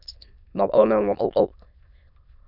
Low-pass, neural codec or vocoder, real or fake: 5.4 kHz; autoencoder, 22.05 kHz, a latent of 192 numbers a frame, VITS, trained on many speakers; fake